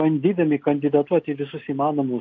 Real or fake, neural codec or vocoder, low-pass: real; none; 7.2 kHz